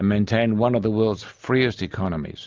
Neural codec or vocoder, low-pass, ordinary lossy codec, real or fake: none; 7.2 kHz; Opus, 32 kbps; real